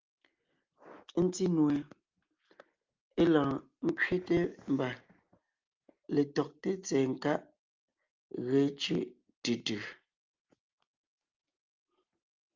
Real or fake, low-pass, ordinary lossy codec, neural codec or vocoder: real; 7.2 kHz; Opus, 32 kbps; none